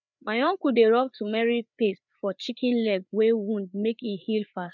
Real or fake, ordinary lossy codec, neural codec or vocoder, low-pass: fake; none; codec, 16 kHz, 4 kbps, FreqCodec, larger model; 7.2 kHz